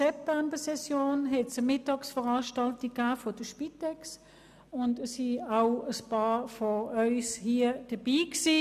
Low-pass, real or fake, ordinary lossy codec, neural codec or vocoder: 14.4 kHz; real; none; none